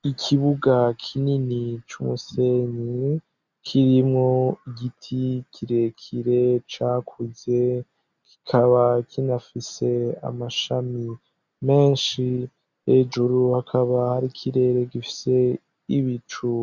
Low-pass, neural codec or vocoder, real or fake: 7.2 kHz; none; real